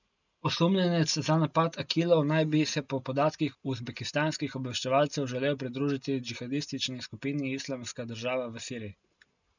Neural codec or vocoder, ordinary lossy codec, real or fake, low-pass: none; none; real; 7.2 kHz